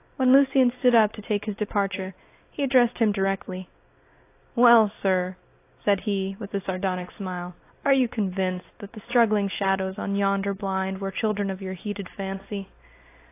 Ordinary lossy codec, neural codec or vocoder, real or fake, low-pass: AAC, 24 kbps; none; real; 3.6 kHz